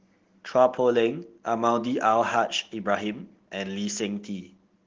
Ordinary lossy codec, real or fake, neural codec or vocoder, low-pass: Opus, 16 kbps; real; none; 7.2 kHz